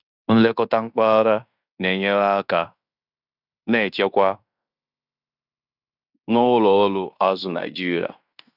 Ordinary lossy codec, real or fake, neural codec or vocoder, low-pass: none; fake; codec, 16 kHz in and 24 kHz out, 0.9 kbps, LongCat-Audio-Codec, fine tuned four codebook decoder; 5.4 kHz